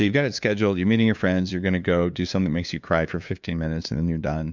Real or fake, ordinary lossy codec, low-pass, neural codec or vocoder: fake; MP3, 64 kbps; 7.2 kHz; codec, 16 kHz, 2 kbps, FunCodec, trained on LibriTTS, 25 frames a second